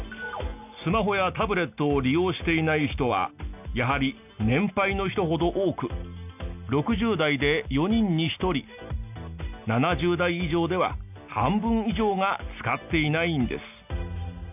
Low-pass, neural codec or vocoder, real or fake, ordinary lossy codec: 3.6 kHz; none; real; none